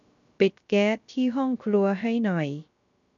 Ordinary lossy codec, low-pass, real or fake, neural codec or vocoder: MP3, 96 kbps; 7.2 kHz; fake; codec, 16 kHz, 0.3 kbps, FocalCodec